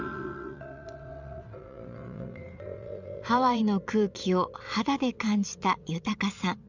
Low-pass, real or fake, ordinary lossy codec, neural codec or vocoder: 7.2 kHz; fake; none; vocoder, 22.05 kHz, 80 mel bands, WaveNeXt